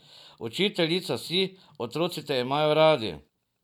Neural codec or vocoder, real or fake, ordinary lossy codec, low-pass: none; real; none; 19.8 kHz